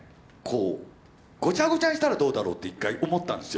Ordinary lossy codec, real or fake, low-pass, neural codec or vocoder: none; real; none; none